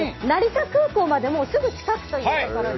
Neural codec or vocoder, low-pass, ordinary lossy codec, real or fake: none; 7.2 kHz; MP3, 24 kbps; real